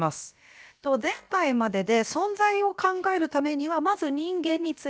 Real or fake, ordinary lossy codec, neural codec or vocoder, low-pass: fake; none; codec, 16 kHz, about 1 kbps, DyCAST, with the encoder's durations; none